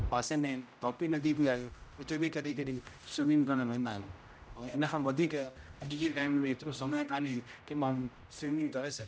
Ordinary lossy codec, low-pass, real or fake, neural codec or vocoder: none; none; fake; codec, 16 kHz, 0.5 kbps, X-Codec, HuBERT features, trained on general audio